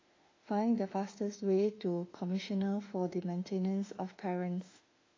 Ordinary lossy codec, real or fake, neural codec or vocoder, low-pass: AAC, 32 kbps; fake; autoencoder, 48 kHz, 32 numbers a frame, DAC-VAE, trained on Japanese speech; 7.2 kHz